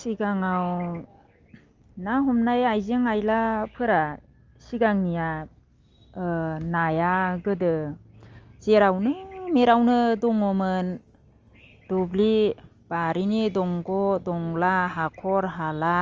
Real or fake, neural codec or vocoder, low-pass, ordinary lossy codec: real; none; 7.2 kHz; Opus, 32 kbps